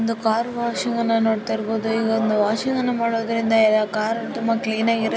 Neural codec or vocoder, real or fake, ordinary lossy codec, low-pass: none; real; none; none